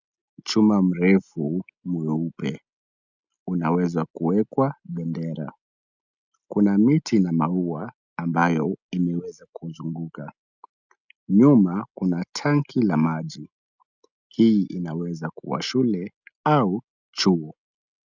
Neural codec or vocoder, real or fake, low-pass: none; real; 7.2 kHz